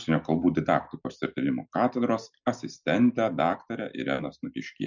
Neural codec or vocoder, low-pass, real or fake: none; 7.2 kHz; real